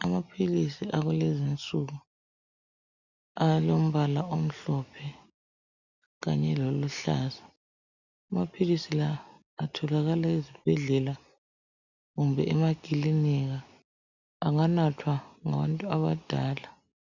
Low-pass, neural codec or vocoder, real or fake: 7.2 kHz; none; real